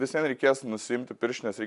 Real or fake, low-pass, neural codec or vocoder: real; 10.8 kHz; none